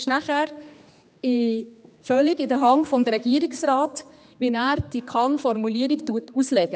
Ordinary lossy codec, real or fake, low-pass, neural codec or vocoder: none; fake; none; codec, 16 kHz, 2 kbps, X-Codec, HuBERT features, trained on general audio